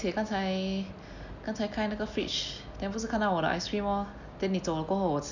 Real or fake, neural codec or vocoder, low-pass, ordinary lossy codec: real; none; 7.2 kHz; none